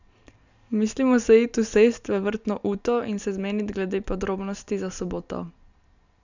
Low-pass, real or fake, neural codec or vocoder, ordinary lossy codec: 7.2 kHz; real; none; none